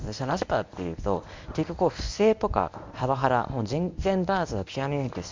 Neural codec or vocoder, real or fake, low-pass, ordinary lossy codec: codec, 24 kHz, 0.9 kbps, WavTokenizer, medium speech release version 1; fake; 7.2 kHz; MP3, 64 kbps